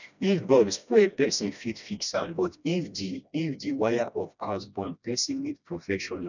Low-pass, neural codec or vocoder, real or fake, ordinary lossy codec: 7.2 kHz; codec, 16 kHz, 1 kbps, FreqCodec, smaller model; fake; none